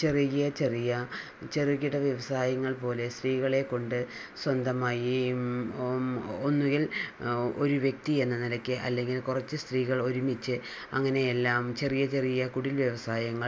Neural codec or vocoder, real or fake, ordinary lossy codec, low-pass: none; real; none; none